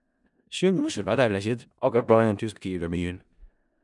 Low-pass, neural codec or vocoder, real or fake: 10.8 kHz; codec, 16 kHz in and 24 kHz out, 0.4 kbps, LongCat-Audio-Codec, four codebook decoder; fake